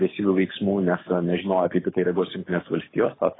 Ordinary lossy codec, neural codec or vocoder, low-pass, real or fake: AAC, 16 kbps; codec, 24 kHz, 6 kbps, HILCodec; 7.2 kHz; fake